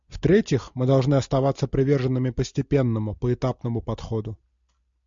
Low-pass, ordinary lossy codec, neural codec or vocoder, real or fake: 7.2 kHz; MP3, 64 kbps; none; real